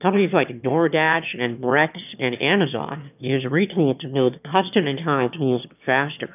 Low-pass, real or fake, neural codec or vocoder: 3.6 kHz; fake; autoencoder, 22.05 kHz, a latent of 192 numbers a frame, VITS, trained on one speaker